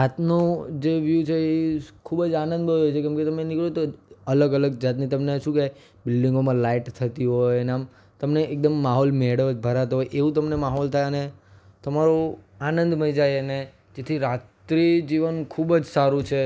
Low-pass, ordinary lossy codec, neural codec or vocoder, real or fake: none; none; none; real